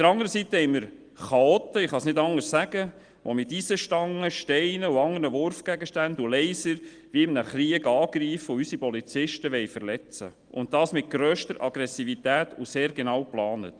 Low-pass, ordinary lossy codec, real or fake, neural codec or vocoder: 9.9 kHz; Opus, 24 kbps; real; none